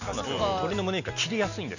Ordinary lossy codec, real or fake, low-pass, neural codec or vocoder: none; real; 7.2 kHz; none